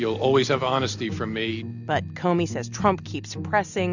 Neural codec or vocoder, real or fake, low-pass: none; real; 7.2 kHz